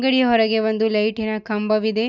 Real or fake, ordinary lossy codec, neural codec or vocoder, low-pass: real; none; none; 7.2 kHz